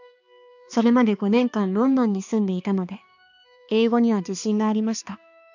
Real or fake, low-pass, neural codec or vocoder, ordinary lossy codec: fake; 7.2 kHz; codec, 16 kHz, 2 kbps, X-Codec, HuBERT features, trained on balanced general audio; none